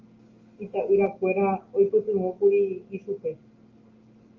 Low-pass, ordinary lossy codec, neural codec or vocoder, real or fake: 7.2 kHz; Opus, 32 kbps; none; real